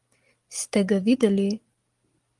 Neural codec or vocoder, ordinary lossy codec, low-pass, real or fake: none; Opus, 24 kbps; 10.8 kHz; real